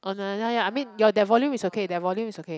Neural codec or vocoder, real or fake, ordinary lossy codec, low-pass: none; real; none; none